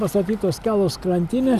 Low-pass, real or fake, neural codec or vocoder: 14.4 kHz; real; none